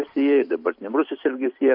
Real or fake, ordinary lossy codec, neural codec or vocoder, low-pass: fake; MP3, 48 kbps; vocoder, 44.1 kHz, 128 mel bands every 256 samples, BigVGAN v2; 5.4 kHz